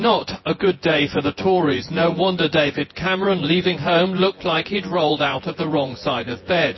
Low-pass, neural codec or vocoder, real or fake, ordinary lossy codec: 7.2 kHz; vocoder, 24 kHz, 100 mel bands, Vocos; fake; MP3, 24 kbps